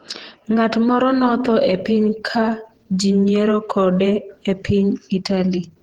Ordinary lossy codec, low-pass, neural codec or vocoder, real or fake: Opus, 16 kbps; 19.8 kHz; vocoder, 48 kHz, 128 mel bands, Vocos; fake